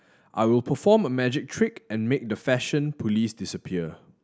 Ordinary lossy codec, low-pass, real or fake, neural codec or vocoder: none; none; real; none